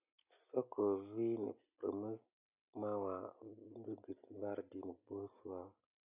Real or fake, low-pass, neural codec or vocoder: real; 3.6 kHz; none